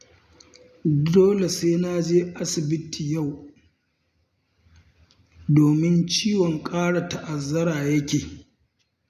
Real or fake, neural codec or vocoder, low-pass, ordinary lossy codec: real; none; 14.4 kHz; none